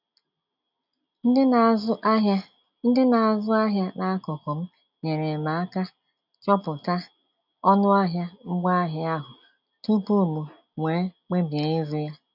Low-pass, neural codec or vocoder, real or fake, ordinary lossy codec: 5.4 kHz; none; real; none